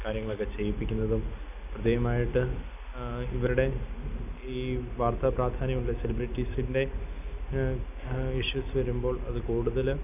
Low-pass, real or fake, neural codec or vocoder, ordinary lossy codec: 3.6 kHz; real; none; none